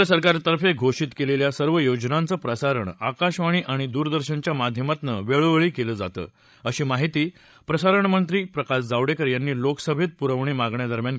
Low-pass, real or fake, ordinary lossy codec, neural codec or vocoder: none; fake; none; codec, 16 kHz, 16 kbps, FreqCodec, larger model